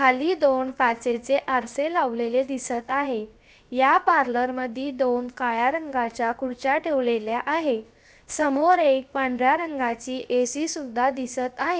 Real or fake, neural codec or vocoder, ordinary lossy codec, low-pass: fake; codec, 16 kHz, 0.7 kbps, FocalCodec; none; none